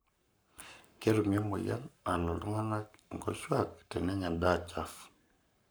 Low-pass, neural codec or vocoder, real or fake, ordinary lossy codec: none; codec, 44.1 kHz, 7.8 kbps, Pupu-Codec; fake; none